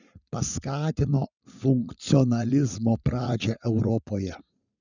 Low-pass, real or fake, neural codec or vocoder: 7.2 kHz; fake; vocoder, 44.1 kHz, 80 mel bands, Vocos